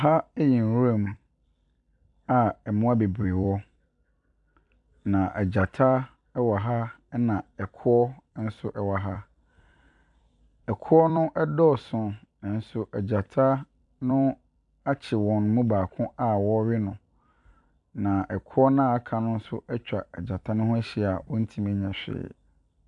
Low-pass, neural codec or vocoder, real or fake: 10.8 kHz; vocoder, 44.1 kHz, 128 mel bands every 256 samples, BigVGAN v2; fake